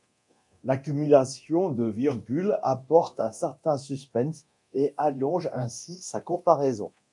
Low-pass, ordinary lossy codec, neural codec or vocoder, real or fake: 10.8 kHz; MP3, 64 kbps; codec, 24 kHz, 0.9 kbps, DualCodec; fake